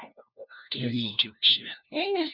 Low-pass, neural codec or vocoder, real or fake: 5.4 kHz; codec, 16 kHz, 1 kbps, FunCodec, trained on LibriTTS, 50 frames a second; fake